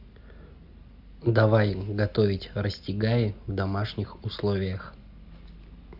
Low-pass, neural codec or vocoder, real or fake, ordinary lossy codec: 5.4 kHz; none; real; AAC, 48 kbps